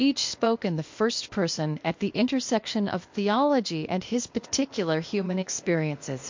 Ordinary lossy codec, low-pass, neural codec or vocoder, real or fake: MP3, 48 kbps; 7.2 kHz; codec, 16 kHz, 0.8 kbps, ZipCodec; fake